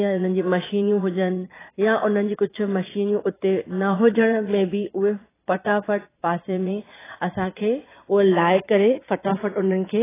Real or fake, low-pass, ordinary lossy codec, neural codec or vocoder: fake; 3.6 kHz; AAC, 16 kbps; vocoder, 22.05 kHz, 80 mel bands, Vocos